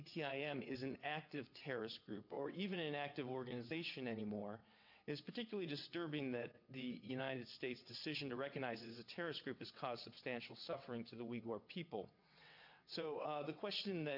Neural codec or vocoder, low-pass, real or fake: vocoder, 22.05 kHz, 80 mel bands, WaveNeXt; 5.4 kHz; fake